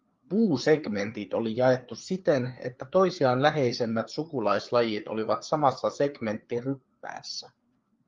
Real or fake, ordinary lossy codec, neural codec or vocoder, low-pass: fake; Opus, 32 kbps; codec, 16 kHz, 4 kbps, FreqCodec, larger model; 7.2 kHz